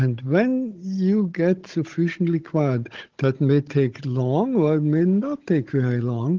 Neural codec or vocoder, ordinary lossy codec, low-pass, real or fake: codec, 16 kHz, 16 kbps, FunCodec, trained on Chinese and English, 50 frames a second; Opus, 16 kbps; 7.2 kHz; fake